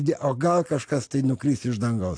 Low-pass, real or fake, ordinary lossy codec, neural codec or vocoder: 9.9 kHz; real; AAC, 48 kbps; none